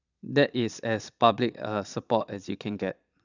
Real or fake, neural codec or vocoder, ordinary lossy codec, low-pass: fake; vocoder, 22.05 kHz, 80 mel bands, Vocos; none; 7.2 kHz